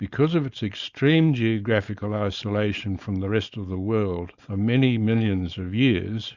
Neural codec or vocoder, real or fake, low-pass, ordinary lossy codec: codec, 16 kHz, 4.8 kbps, FACodec; fake; 7.2 kHz; Opus, 64 kbps